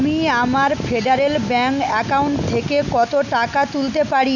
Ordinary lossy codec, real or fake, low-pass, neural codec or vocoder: none; real; 7.2 kHz; none